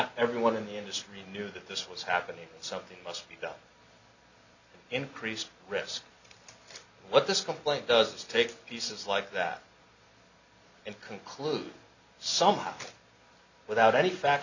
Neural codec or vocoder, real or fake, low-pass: none; real; 7.2 kHz